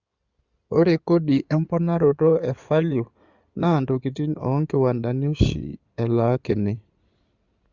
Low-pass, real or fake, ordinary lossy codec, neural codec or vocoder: 7.2 kHz; fake; Opus, 64 kbps; codec, 16 kHz in and 24 kHz out, 2.2 kbps, FireRedTTS-2 codec